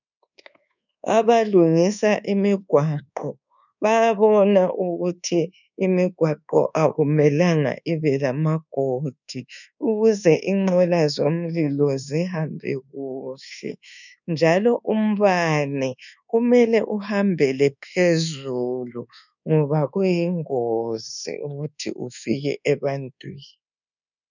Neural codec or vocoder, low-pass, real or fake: codec, 24 kHz, 1.2 kbps, DualCodec; 7.2 kHz; fake